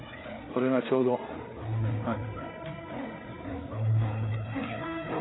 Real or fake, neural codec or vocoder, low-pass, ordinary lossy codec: fake; codec, 16 kHz, 4 kbps, FreqCodec, larger model; 7.2 kHz; AAC, 16 kbps